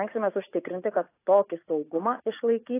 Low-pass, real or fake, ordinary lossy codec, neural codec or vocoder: 3.6 kHz; fake; AAC, 24 kbps; vocoder, 44.1 kHz, 80 mel bands, Vocos